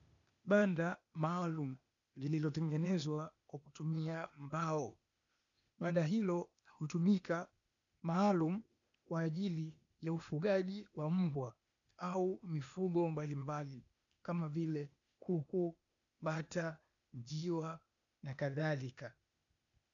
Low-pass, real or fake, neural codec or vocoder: 7.2 kHz; fake; codec, 16 kHz, 0.8 kbps, ZipCodec